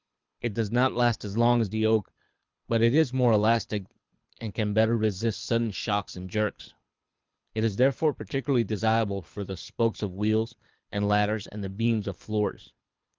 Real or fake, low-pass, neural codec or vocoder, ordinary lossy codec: fake; 7.2 kHz; codec, 24 kHz, 6 kbps, HILCodec; Opus, 32 kbps